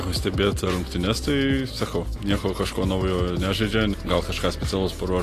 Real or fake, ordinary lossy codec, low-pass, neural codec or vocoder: real; AAC, 48 kbps; 14.4 kHz; none